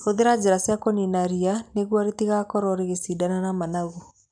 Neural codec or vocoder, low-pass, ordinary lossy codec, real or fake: none; 9.9 kHz; none; real